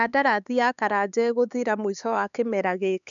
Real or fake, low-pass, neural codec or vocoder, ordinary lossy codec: fake; 7.2 kHz; codec, 16 kHz, 4 kbps, X-Codec, HuBERT features, trained on LibriSpeech; none